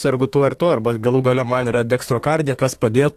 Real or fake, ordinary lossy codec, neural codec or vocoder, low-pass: fake; AAC, 64 kbps; codec, 32 kHz, 1.9 kbps, SNAC; 14.4 kHz